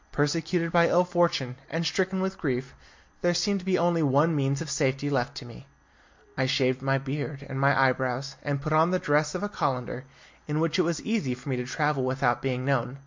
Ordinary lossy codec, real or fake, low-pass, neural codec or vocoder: MP3, 48 kbps; real; 7.2 kHz; none